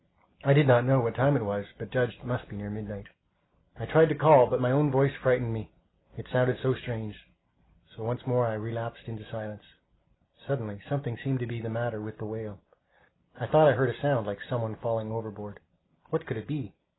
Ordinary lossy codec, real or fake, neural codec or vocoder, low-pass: AAC, 16 kbps; real; none; 7.2 kHz